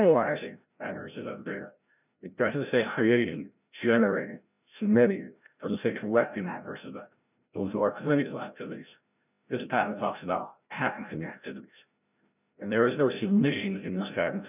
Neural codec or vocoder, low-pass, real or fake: codec, 16 kHz, 0.5 kbps, FreqCodec, larger model; 3.6 kHz; fake